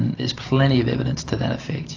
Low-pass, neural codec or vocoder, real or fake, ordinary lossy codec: 7.2 kHz; none; real; AAC, 48 kbps